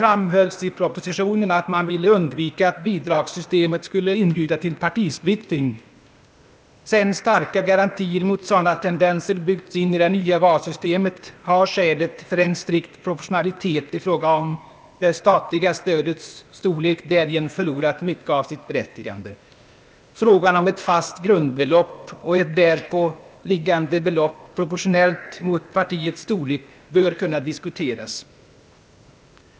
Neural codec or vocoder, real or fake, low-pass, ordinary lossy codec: codec, 16 kHz, 0.8 kbps, ZipCodec; fake; none; none